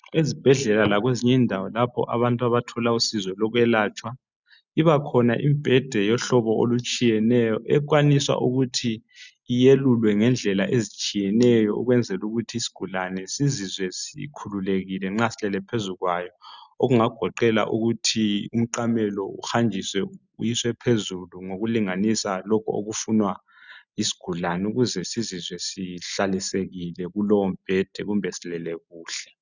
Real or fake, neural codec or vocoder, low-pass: real; none; 7.2 kHz